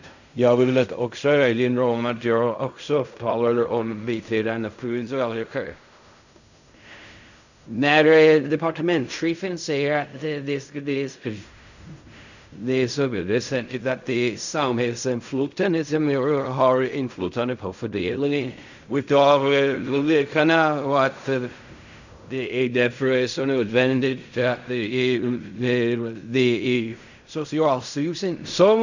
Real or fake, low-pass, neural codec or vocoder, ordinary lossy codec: fake; 7.2 kHz; codec, 16 kHz in and 24 kHz out, 0.4 kbps, LongCat-Audio-Codec, fine tuned four codebook decoder; none